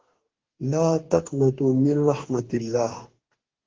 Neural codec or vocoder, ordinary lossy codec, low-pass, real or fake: codec, 44.1 kHz, 2.6 kbps, DAC; Opus, 16 kbps; 7.2 kHz; fake